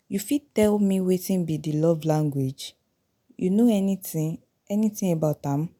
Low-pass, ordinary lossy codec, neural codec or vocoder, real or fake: none; none; none; real